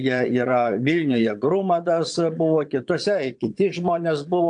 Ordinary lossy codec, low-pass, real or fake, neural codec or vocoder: AAC, 64 kbps; 9.9 kHz; fake; vocoder, 22.05 kHz, 80 mel bands, Vocos